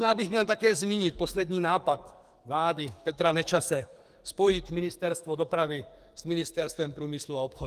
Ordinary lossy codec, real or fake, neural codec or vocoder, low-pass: Opus, 32 kbps; fake; codec, 44.1 kHz, 2.6 kbps, SNAC; 14.4 kHz